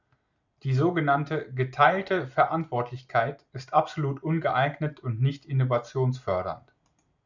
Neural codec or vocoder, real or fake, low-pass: none; real; 7.2 kHz